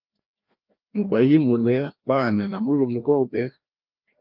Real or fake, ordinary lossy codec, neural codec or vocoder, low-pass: fake; Opus, 24 kbps; codec, 16 kHz, 1 kbps, FreqCodec, larger model; 5.4 kHz